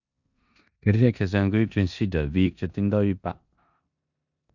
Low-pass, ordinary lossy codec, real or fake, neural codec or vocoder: 7.2 kHz; none; fake; codec, 16 kHz in and 24 kHz out, 0.9 kbps, LongCat-Audio-Codec, four codebook decoder